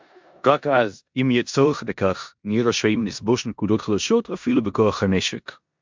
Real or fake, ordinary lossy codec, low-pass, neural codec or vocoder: fake; MP3, 64 kbps; 7.2 kHz; codec, 16 kHz in and 24 kHz out, 0.9 kbps, LongCat-Audio-Codec, four codebook decoder